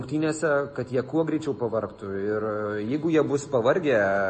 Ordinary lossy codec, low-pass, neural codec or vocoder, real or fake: MP3, 32 kbps; 10.8 kHz; none; real